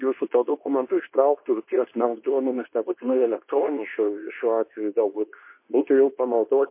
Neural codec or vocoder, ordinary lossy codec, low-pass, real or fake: codec, 24 kHz, 0.9 kbps, WavTokenizer, medium speech release version 2; MP3, 24 kbps; 3.6 kHz; fake